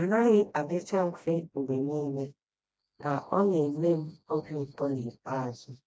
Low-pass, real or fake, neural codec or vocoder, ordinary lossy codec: none; fake; codec, 16 kHz, 1 kbps, FreqCodec, smaller model; none